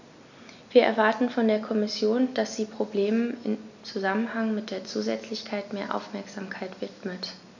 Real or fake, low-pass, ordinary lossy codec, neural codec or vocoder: real; 7.2 kHz; none; none